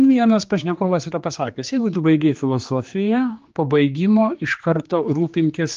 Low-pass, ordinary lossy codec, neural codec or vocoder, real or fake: 7.2 kHz; Opus, 32 kbps; codec, 16 kHz, 2 kbps, X-Codec, HuBERT features, trained on general audio; fake